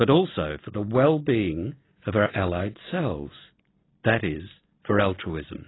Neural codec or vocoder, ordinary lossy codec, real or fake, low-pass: none; AAC, 16 kbps; real; 7.2 kHz